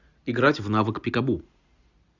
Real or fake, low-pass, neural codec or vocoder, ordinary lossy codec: real; 7.2 kHz; none; Opus, 64 kbps